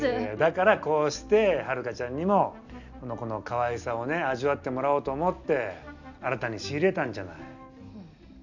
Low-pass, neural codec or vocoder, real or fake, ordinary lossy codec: 7.2 kHz; none; real; none